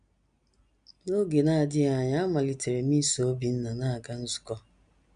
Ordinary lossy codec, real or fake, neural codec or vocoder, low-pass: MP3, 96 kbps; real; none; 9.9 kHz